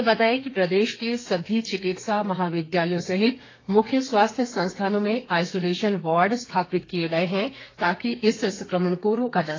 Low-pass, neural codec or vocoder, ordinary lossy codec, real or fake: 7.2 kHz; codec, 32 kHz, 1.9 kbps, SNAC; AAC, 32 kbps; fake